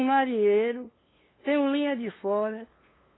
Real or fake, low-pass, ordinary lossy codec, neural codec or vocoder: fake; 7.2 kHz; AAC, 16 kbps; codec, 16 kHz, 2 kbps, FunCodec, trained on LibriTTS, 25 frames a second